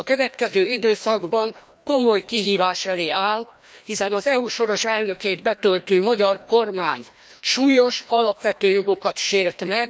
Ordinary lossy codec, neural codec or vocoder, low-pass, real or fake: none; codec, 16 kHz, 1 kbps, FreqCodec, larger model; none; fake